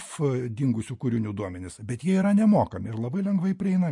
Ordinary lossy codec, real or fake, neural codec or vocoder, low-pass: MP3, 48 kbps; real; none; 10.8 kHz